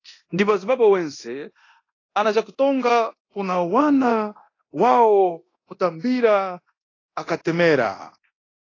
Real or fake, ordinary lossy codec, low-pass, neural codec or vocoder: fake; AAC, 32 kbps; 7.2 kHz; codec, 24 kHz, 0.9 kbps, DualCodec